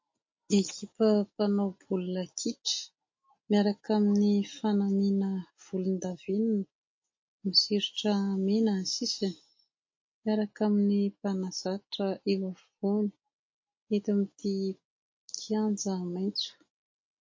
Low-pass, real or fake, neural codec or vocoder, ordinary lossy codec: 7.2 kHz; real; none; MP3, 32 kbps